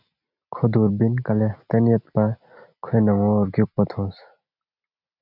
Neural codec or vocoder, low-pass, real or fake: none; 5.4 kHz; real